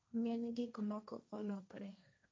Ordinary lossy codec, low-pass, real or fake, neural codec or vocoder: none; none; fake; codec, 16 kHz, 1.1 kbps, Voila-Tokenizer